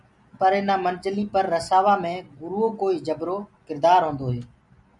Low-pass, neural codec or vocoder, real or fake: 10.8 kHz; none; real